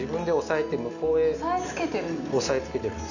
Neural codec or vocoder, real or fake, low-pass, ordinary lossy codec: none; real; 7.2 kHz; none